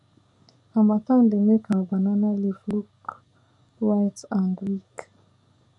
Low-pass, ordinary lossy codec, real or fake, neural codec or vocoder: 10.8 kHz; none; fake; codec, 44.1 kHz, 7.8 kbps, DAC